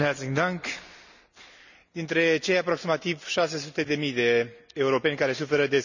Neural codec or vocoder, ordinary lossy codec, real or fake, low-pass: none; none; real; 7.2 kHz